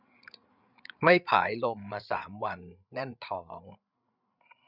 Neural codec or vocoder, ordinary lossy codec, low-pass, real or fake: codec, 16 kHz, 8 kbps, FreqCodec, larger model; none; 5.4 kHz; fake